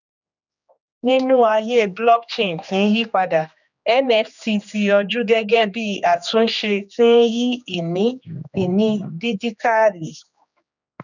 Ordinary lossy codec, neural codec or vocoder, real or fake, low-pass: none; codec, 16 kHz, 2 kbps, X-Codec, HuBERT features, trained on general audio; fake; 7.2 kHz